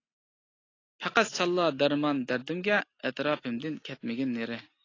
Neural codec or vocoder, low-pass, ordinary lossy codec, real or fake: none; 7.2 kHz; AAC, 32 kbps; real